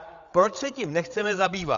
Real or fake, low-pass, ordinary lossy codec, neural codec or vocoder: fake; 7.2 kHz; Opus, 64 kbps; codec, 16 kHz, 8 kbps, FreqCodec, larger model